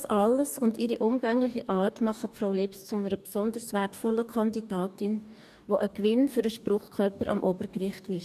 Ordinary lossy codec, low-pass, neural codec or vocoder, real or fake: none; 14.4 kHz; codec, 44.1 kHz, 2.6 kbps, DAC; fake